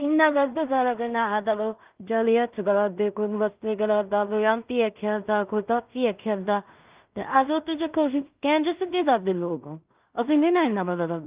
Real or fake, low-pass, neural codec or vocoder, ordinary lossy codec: fake; 3.6 kHz; codec, 16 kHz in and 24 kHz out, 0.4 kbps, LongCat-Audio-Codec, two codebook decoder; Opus, 32 kbps